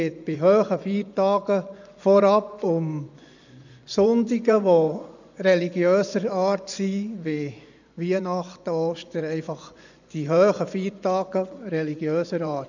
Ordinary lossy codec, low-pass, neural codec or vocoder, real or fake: none; 7.2 kHz; vocoder, 24 kHz, 100 mel bands, Vocos; fake